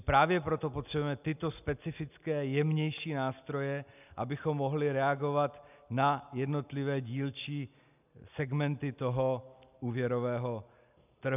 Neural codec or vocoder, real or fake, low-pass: none; real; 3.6 kHz